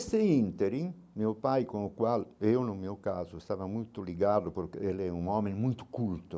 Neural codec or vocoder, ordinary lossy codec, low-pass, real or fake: none; none; none; real